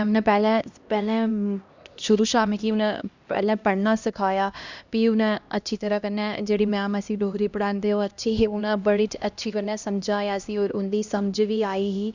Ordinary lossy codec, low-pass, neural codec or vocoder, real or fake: Opus, 64 kbps; 7.2 kHz; codec, 16 kHz, 1 kbps, X-Codec, HuBERT features, trained on LibriSpeech; fake